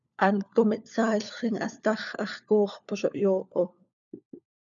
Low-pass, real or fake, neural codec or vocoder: 7.2 kHz; fake; codec, 16 kHz, 4 kbps, FunCodec, trained on LibriTTS, 50 frames a second